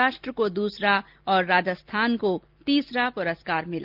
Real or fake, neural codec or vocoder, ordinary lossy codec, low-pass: real; none; Opus, 32 kbps; 5.4 kHz